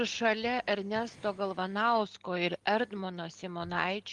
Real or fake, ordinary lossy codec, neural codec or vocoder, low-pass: real; Opus, 24 kbps; none; 10.8 kHz